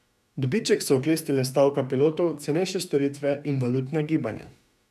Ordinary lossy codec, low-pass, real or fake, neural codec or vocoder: none; 14.4 kHz; fake; autoencoder, 48 kHz, 32 numbers a frame, DAC-VAE, trained on Japanese speech